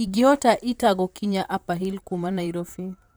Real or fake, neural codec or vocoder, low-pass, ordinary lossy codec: fake; vocoder, 44.1 kHz, 128 mel bands, Pupu-Vocoder; none; none